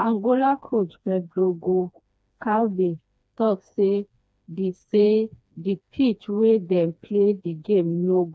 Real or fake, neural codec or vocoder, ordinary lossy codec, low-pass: fake; codec, 16 kHz, 2 kbps, FreqCodec, smaller model; none; none